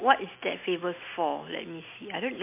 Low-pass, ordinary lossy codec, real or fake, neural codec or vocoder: 3.6 kHz; MP3, 24 kbps; real; none